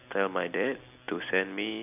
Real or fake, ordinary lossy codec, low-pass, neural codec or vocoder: real; none; 3.6 kHz; none